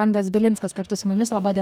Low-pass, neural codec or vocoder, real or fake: 19.8 kHz; codec, 44.1 kHz, 2.6 kbps, DAC; fake